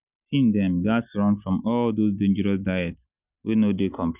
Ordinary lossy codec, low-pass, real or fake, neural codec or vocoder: none; 3.6 kHz; real; none